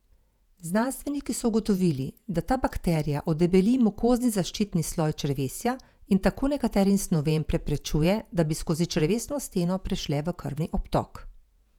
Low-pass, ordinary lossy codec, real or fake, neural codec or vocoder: 19.8 kHz; none; fake; vocoder, 48 kHz, 128 mel bands, Vocos